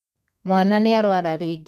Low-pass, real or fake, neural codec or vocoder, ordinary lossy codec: 14.4 kHz; fake; codec, 32 kHz, 1.9 kbps, SNAC; none